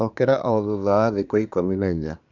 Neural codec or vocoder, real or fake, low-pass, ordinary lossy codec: codec, 24 kHz, 1 kbps, SNAC; fake; 7.2 kHz; none